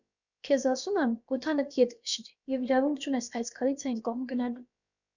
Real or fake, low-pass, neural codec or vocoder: fake; 7.2 kHz; codec, 16 kHz, about 1 kbps, DyCAST, with the encoder's durations